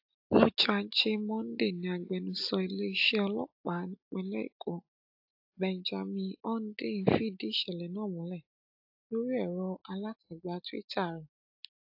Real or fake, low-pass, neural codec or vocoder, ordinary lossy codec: real; 5.4 kHz; none; AAC, 48 kbps